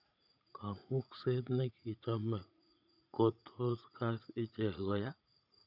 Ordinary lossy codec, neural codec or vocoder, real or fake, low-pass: none; vocoder, 44.1 kHz, 80 mel bands, Vocos; fake; 5.4 kHz